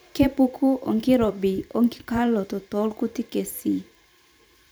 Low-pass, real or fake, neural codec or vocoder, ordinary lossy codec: none; fake; vocoder, 44.1 kHz, 128 mel bands every 512 samples, BigVGAN v2; none